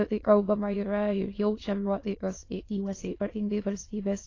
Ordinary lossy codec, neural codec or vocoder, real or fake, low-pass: AAC, 32 kbps; autoencoder, 22.05 kHz, a latent of 192 numbers a frame, VITS, trained on many speakers; fake; 7.2 kHz